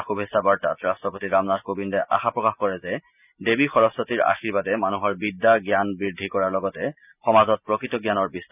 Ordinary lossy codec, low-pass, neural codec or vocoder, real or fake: none; 3.6 kHz; none; real